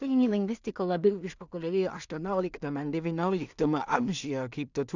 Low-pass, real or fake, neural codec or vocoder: 7.2 kHz; fake; codec, 16 kHz in and 24 kHz out, 0.4 kbps, LongCat-Audio-Codec, two codebook decoder